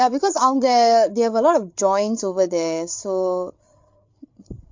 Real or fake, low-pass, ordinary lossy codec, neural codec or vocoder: fake; 7.2 kHz; MP3, 48 kbps; codec, 16 kHz, 8 kbps, FreqCodec, larger model